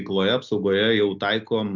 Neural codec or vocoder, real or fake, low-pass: none; real; 7.2 kHz